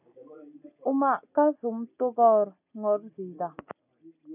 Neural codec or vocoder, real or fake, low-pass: none; real; 3.6 kHz